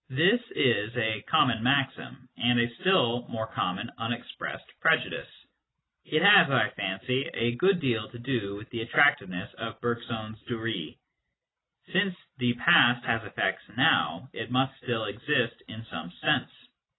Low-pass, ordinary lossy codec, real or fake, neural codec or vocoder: 7.2 kHz; AAC, 16 kbps; real; none